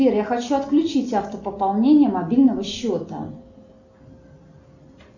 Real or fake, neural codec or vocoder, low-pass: real; none; 7.2 kHz